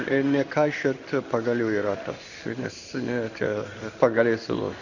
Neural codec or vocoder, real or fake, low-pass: codec, 16 kHz in and 24 kHz out, 1 kbps, XY-Tokenizer; fake; 7.2 kHz